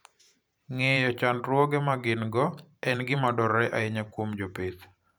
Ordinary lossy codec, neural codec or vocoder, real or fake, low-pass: none; vocoder, 44.1 kHz, 128 mel bands every 512 samples, BigVGAN v2; fake; none